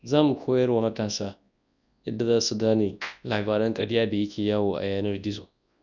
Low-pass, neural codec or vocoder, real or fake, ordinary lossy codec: 7.2 kHz; codec, 24 kHz, 0.9 kbps, WavTokenizer, large speech release; fake; none